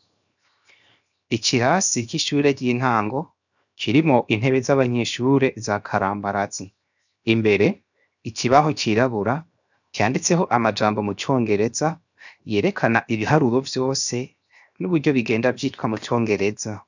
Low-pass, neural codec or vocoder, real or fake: 7.2 kHz; codec, 16 kHz, 0.7 kbps, FocalCodec; fake